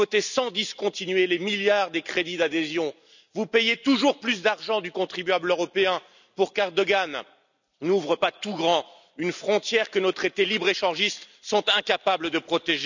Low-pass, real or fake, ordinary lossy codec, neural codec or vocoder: 7.2 kHz; real; none; none